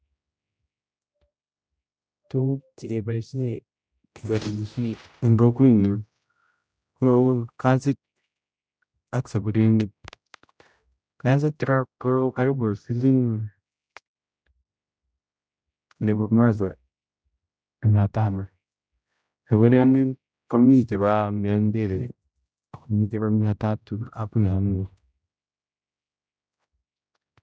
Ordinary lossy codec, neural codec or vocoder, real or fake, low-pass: none; codec, 16 kHz, 0.5 kbps, X-Codec, HuBERT features, trained on general audio; fake; none